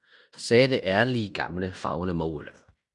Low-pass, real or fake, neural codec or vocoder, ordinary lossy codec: 10.8 kHz; fake; codec, 16 kHz in and 24 kHz out, 0.9 kbps, LongCat-Audio-Codec, fine tuned four codebook decoder; MP3, 96 kbps